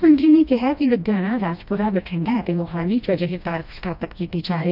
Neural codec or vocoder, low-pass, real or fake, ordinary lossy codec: codec, 16 kHz, 1 kbps, FreqCodec, smaller model; 5.4 kHz; fake; none